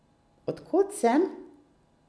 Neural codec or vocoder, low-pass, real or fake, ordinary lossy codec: none; none; real; none